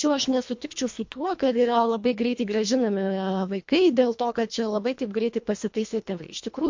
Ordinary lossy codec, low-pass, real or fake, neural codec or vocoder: MP3, 48 kbps; 7.2 kHz; fake; codec, 24 kHz, 1.5 kbps, HILCodec